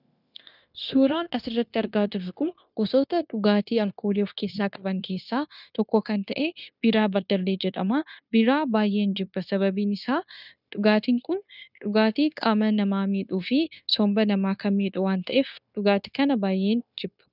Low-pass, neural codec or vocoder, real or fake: 5.4 kHz; codec, 16 kHz, 0.9 kbps, LongCat-Audio-Codec; fake